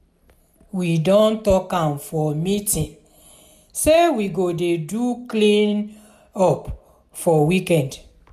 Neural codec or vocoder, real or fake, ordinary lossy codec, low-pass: none; real; none; 14.4 kHz